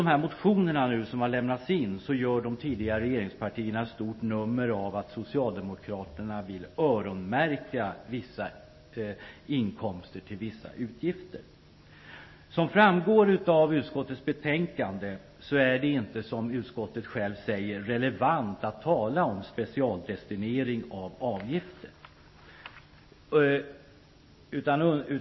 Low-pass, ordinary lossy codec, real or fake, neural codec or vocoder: 7.2 kHz; MP3, 24 kbps; real; none